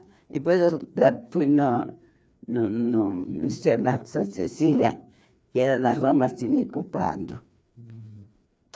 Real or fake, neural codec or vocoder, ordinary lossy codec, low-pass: fake; codec, 16 kHz, 2 kbps, FreqCodec, larger model; none; none